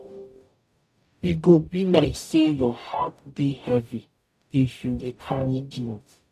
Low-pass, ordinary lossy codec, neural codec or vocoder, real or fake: 14.4 kHz; none; codec, 44.1 kHz, 0.9 kbps, DAC; fake